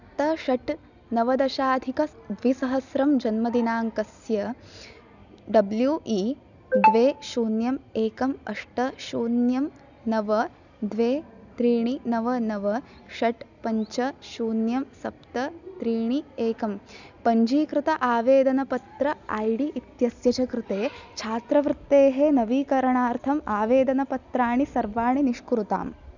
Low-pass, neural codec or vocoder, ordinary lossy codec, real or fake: 7.2 kHz; none; none; real